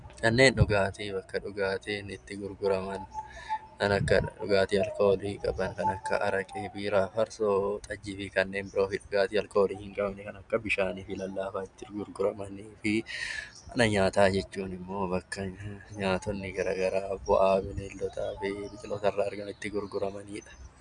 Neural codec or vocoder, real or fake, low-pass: none; real; 9.9 kHz